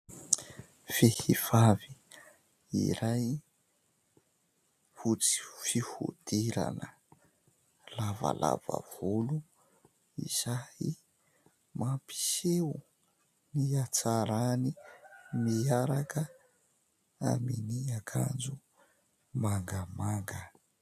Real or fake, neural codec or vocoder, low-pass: real; none; 14.4 kHz